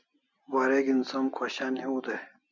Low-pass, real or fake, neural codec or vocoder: 7.2 kHz; real; none